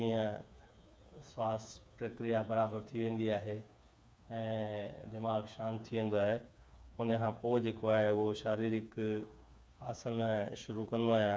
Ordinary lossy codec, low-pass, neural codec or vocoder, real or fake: none; none; codec, 16 kHz, 4 kbps, FreqCodec, smaller model; fake